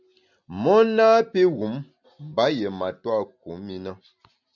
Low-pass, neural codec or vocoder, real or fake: 7.2 kHz; none; real